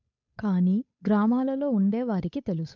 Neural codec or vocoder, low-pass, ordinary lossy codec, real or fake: codec, 16 kHz, 8 kbps, FunCodec, trained on Chinese and English, 25 frames a second; 7.2 kHz; MP3, 64 kbps; fake